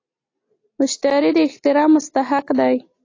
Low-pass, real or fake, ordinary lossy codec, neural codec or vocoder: 7.2 kHz; real; AAC, 32 kbps; none